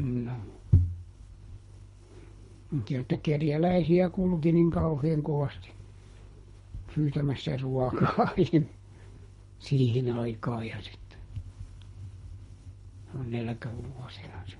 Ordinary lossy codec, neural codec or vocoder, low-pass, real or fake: MP3, 48 kbps; codec, 24 kHz, 3 kbps, HILCodec; 10.8 kHz; fake